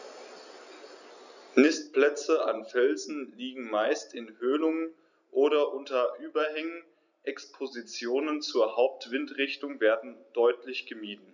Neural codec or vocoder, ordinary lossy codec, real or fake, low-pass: none; none; real; 7.2 kHz